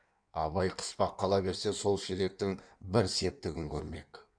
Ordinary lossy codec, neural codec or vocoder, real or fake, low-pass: none; codec, 16 kHz in and 24 kHz out, 1.1 kbps, FireRedTTS-2 codec; fake; 9.9 kHz